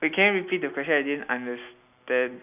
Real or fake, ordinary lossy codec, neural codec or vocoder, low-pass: real; none; none; 3.6 kHz